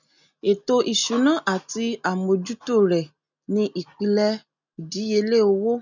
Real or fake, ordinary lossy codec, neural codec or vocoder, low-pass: real; none; none; 7.2 kHz